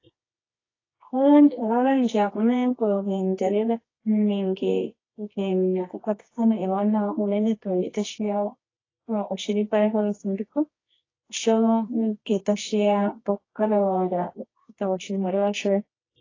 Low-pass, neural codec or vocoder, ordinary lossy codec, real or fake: 7.2 kHz; codec, 24 kHz, 0.9 kbps, WavTokenizer, medium music audio release; AAC, 32 kbps; fake